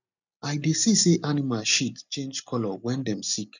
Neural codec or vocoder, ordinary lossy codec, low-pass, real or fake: none; none; 7.2 kHz; real